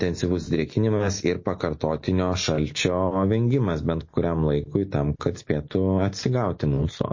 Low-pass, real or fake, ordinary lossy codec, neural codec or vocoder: 7.2 kHz; real; MP3, 32 kbps; none